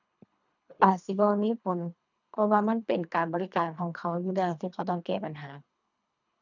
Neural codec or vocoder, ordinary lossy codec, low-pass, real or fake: codec, 24 kHz, 3 kbps, HILCodec; none; 7.2 kHz; fake